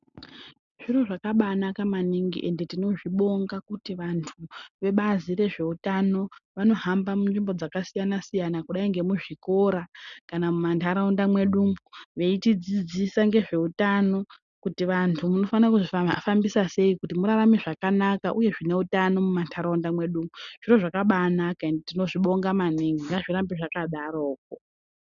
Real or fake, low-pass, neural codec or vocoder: real; 7.2 kHz; none